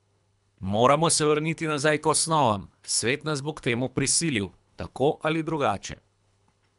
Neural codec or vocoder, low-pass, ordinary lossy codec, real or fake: codec, 24 kHz, 3 kbps, HILCodec; 10.8 kHz; none; fake